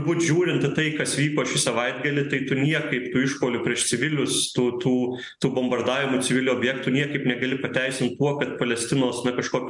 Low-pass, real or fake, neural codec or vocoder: 10.8 kHz; real; none